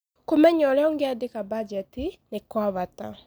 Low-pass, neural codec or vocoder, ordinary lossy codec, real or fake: none; none; none; real